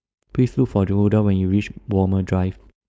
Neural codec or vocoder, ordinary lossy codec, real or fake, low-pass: codec, 16 kHz, 4.8 kbps, FACodec; none; fake; none